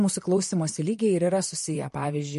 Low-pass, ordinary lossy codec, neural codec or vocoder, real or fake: 14.4 kHz; MP3, 48 kbps; vocoder, 44.1 kHz, 128 mel bands, Pupu-Vocoder; fake